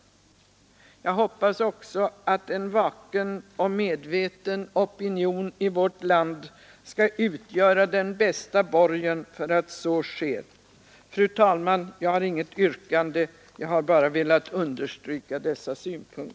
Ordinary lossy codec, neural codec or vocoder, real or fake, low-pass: none; none; real; none